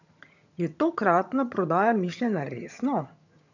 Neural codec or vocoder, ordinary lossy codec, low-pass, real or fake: vocoder, 22.05 kHz, 80 mel bands, HiFi-GAN; none; 7.2 kHz; fake